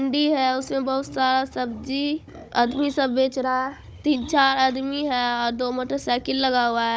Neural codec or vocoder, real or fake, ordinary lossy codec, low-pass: codec, 16 kHz, 16 kbps, FunCodec, trained on Chinese and English, 50 frames a second; fake; none; none